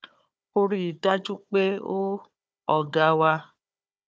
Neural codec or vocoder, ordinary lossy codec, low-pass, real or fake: codec, 16 kHz, 4 kbps, FunCodec, trained on Chinese and English, 50 frames a second; none; none; fake